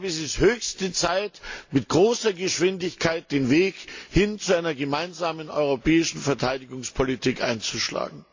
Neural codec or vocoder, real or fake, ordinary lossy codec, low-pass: none; real; AAC, 48 kbps; 7.2 kHz